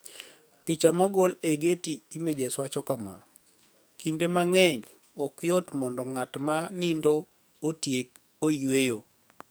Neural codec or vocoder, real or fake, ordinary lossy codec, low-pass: codec, 44.1 kHz, 2.6 kbps, SNAC; fake; none; none